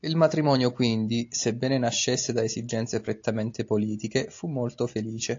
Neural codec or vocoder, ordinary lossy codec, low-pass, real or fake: none; AAC, 48 kbps; 7.2 kHz; real